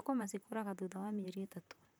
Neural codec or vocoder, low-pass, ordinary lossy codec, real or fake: vocoder, 44.1 kHz, 128 mel bands, Pupu-Vocoder; none; none; fake